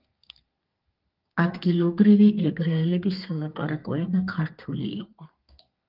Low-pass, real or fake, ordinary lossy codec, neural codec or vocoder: 5.4 kHz; fake; Opus, 24 kbps; codec, 32 kHz, 1.9 kbps, SNAC